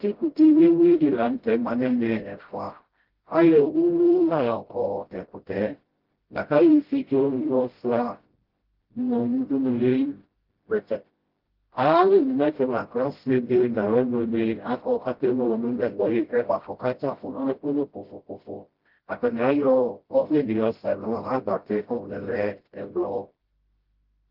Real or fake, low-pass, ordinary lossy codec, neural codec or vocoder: fake; 5.4 kHz; Opus, 16 kbps; codec, 16 kHz, 0.5 kbps, FreqCodec, smaller model